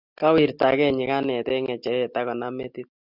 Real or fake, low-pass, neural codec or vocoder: real; 5.4 kHz; none